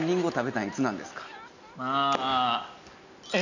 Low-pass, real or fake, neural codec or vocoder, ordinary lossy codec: 7.2 kHz; real; none; none